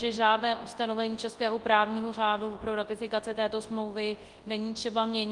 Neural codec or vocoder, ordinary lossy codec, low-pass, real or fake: codec, 24 kHz, 0.9 kbps, WavTokenizer, large speech release; Opus, 24 kbps; 10.8 kHz; fake